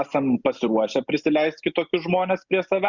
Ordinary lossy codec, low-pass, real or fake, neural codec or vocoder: Opus, 64 kbps; 7.2 kHz; real; none